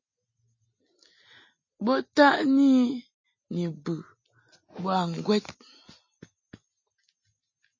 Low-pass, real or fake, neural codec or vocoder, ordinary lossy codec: 7.2 kHz; real; none; MP3, 32 kbps